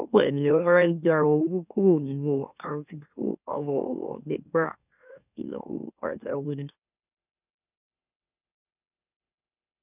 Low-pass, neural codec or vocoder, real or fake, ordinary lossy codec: 3.6 kHz; autoencoder, 44.1 kHz, a latent of 192 numbers a frame, MeloTTS; fake; none